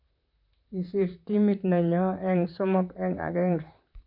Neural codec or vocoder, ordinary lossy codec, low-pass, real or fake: vocoder, 44.1 kHz, 128 mel bands, Pupu-Vocoder; none; 5.4 kHz; fake